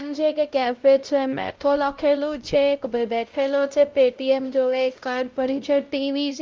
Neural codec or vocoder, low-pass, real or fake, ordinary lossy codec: codec, 16 kHz, 0.5 kbps, X-Codec, WavLM features, trained on Multilingual LibriSpeech; 7.2 kHz; fake; Opus, 32 kbps